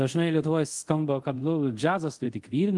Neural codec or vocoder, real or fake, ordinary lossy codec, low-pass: codec, 24 kHz, 0.5 kbps, DualCodec; fake; Opus, 24 kbps; 10.8 kHz